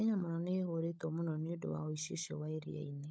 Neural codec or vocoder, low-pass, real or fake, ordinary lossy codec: codec, 16 kHz, 16 kbps, FunCodec, trained on LibriTTS, 50 frames a second; none; fake; none